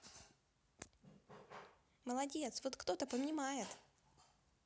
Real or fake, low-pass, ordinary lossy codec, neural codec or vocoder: real; none; none; none